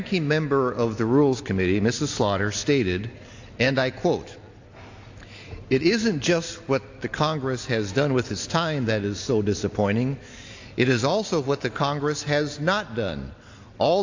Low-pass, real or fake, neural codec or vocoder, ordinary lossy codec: 7.2 kHz; real; none; AAC, 48 kbps